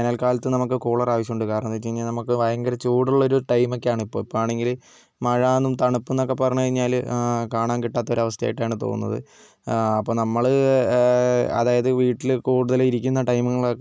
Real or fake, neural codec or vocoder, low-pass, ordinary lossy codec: real; none; none; none